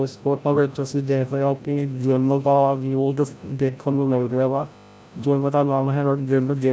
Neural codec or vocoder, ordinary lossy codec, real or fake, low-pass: codec, 16 kHz, 0.5 kbps, FreqCodec, larger model; none; fake; none